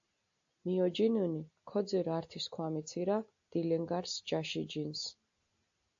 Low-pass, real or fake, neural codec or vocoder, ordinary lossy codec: 7.2 kHz; real; none; AAC, 64 kbps